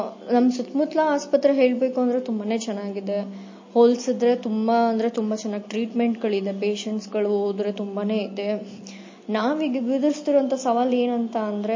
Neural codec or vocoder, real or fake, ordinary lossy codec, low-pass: none; real; MP3, 32 kbps; 7.2 kHz